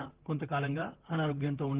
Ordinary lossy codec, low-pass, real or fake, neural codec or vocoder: Opus, 16 kbps; 3.6 kHz; fake; vocoder, 44.1 kHz, 128 mel bands, Pupu-Vocoder